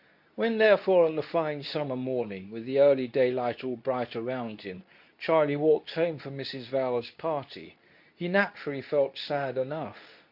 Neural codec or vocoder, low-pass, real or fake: codec, 24 kHz, 0.9 kbps, WavTokenizer, medium speech release version 2; 5.4 kHz; fake